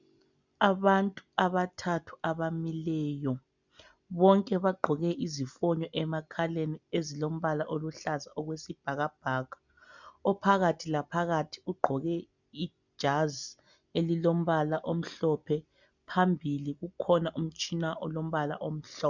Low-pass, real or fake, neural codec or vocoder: 7.2 kHz; real; none